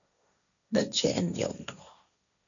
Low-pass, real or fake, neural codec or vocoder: 7.2 kHz; fake; codec, 16 kHz, 1.1 kbps, Voila-Tokenizer